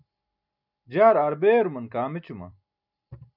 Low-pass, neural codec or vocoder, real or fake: 5.4 kHz; vocoder, 44.1 kHz, 128 mel bands every 256 samples, BigVGAN v2; fake